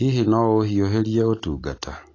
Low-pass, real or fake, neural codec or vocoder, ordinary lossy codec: 7.2 kHz; real; none; AAC, 32 kbps